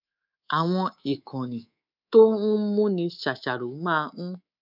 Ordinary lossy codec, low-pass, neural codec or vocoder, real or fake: none; 5.4 kHz; codec, 24 kHz, 3.1 kbps, DualCodec; fake